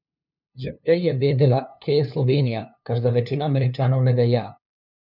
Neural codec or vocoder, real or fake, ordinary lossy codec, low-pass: codec, 16 kHz, 2 kbps, FunCodec, trained on LibriTTS, 25 frames a second; fake; none; 5.4 kHz